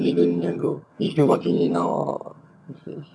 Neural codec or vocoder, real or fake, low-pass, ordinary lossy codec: vocoder, 22.05 kHz, 80 mel bands, HiFi-GAN; fake; none; none